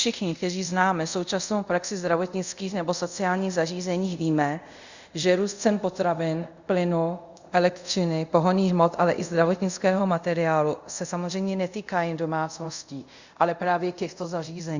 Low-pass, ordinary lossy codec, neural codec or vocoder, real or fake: 7.2 kHz; Opus, 64 kbps; codec, 24 kHz, 0.5 kbps, DualCodec; fake